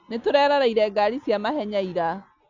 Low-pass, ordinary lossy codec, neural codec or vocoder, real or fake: 7.2 kHz; none; none; real